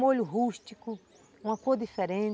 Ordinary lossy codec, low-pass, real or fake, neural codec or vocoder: none; none; real; none